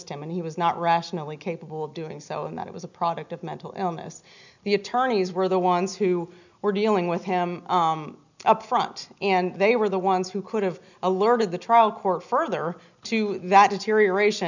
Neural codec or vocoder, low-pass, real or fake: none; 7.2 kHz; real